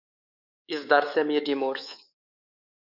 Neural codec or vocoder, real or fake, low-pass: codec, 24 kHz, 3.1 kbps, DualCodec; fake; 5.4 kHz